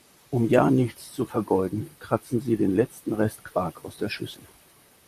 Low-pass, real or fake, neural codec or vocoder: 14.4 kHz; fake; vocoder, 44.1 kHz, 128 mel bands, Pupu-Vocoder